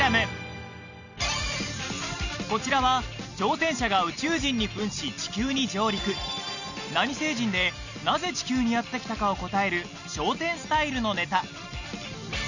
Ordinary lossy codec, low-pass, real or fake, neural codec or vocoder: none; 7.2 kHz; real; none